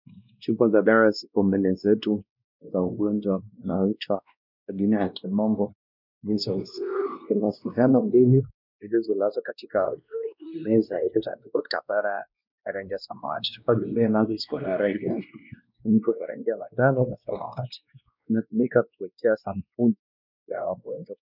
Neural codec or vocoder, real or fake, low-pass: codec, 16 kHz, 1 kbps, X-Codec, WavLM features, trained on Multilingual LibriSpeech; fake; 5.4 kHz